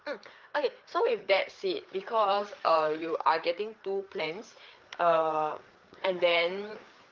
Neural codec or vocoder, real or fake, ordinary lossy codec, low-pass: codec, 16 kHz, 4 kbps, FreqCodec, larger model; fake; Opus, 32 kbps; 7.2 kHz